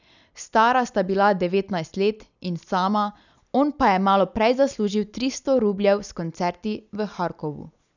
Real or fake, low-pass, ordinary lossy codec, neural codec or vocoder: real; 7.2 kHz; none; none